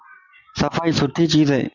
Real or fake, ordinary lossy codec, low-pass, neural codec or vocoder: real; Opus, 64 kbps; 7.2 kHz; none